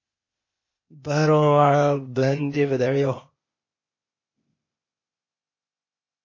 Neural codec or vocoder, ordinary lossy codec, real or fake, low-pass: codec, 16 kHz, 0.8 kbps, ZipCodec; MP3, 32 kbps; fake; 7.2 kHz